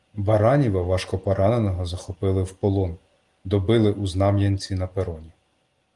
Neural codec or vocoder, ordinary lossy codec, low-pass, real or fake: none; Opus, 24 kbps; 10.8 kHz; real